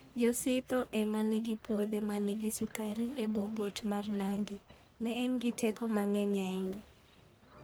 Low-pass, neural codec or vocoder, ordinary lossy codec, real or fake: none; codec, 44.1 kHz, 1.7 kbps, Pupu-Codec; none; fake